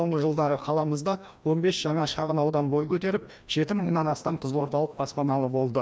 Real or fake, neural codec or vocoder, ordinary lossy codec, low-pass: fake; codec, 16 kHz, 1 kbps, FreqCodec, larger model; none; none